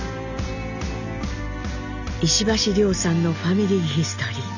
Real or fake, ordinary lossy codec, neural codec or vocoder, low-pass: real; none; none; 7.2 kHz